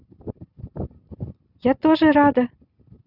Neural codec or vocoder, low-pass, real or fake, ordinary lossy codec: none; 5.4 kHz; real; none